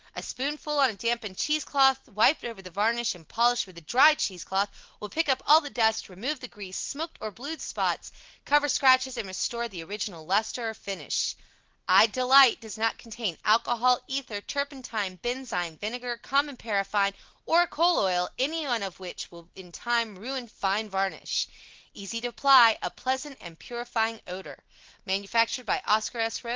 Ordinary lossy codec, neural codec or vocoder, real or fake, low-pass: Opus, 16 kbps; none; real; 7.2 kHz